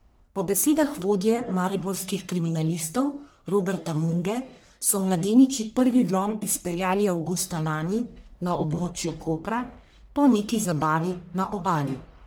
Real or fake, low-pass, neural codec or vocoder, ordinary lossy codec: fake; none; codec, 44.1 kHz, 1.7 kbps, Pupu-Codec; none